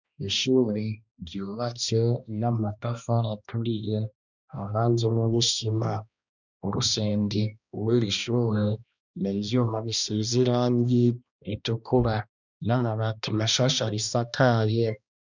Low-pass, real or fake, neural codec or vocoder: 7.2 kHz; fake; codec, 16 kHz, 1 kbps, X-Codec, HuBERT features, trained on balanced general audio